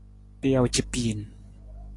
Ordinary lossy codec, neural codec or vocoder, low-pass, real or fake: AAC, 48 kbps; none; 10.8 kHz; real